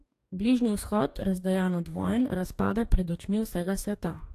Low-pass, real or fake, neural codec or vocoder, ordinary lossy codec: 14.4 kHz; fake; codec, 44.1 kHz, 2.6 kbps, DAC; AAC, 64 kbps